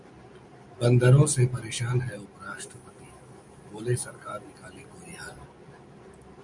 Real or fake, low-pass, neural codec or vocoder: real; 10.8 kHz; none